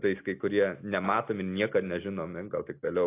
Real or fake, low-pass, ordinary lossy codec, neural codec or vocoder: real; 3.6 kHz; AAC, 24 kbps; none